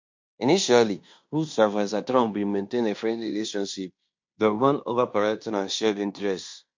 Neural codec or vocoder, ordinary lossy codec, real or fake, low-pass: codec, 16 kHz in and 24 kHz out, 0.9 kbps, LongCat-Audio-Codec, fine tuned four codebook decoder; MP3, 48 kbps; fake; 7.2 kHz